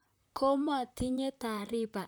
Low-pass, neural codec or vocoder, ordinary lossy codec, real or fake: none; vocoder, 44.1 kHz, 128 mel bands, Pupu-Vocoder; none; fake